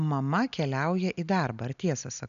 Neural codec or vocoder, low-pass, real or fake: none; 7.2 kHz; real